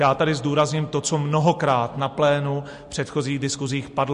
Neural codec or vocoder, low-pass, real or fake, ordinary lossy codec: none; 14.4 kHz; real; MP3, 48 kbps